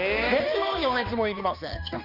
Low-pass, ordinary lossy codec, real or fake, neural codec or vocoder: 5.4 kHz; none; fake; codec, 16 kHz, 2 kbps, X-Codec, HuBERT features, trained on balanced general audio